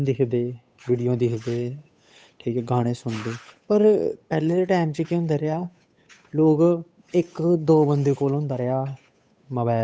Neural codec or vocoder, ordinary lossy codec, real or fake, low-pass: codec, 16 kHz, 8 kbps, FunCodec, trained on Chinese and English, 25 frames a second; none; fake; none